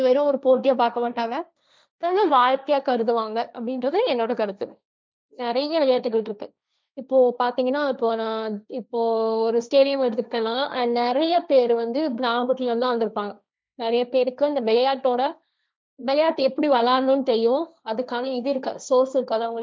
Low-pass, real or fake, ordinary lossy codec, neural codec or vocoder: none; fake; none; codec, 16 kHz, 1.1 kbps, Voila-Tokenizer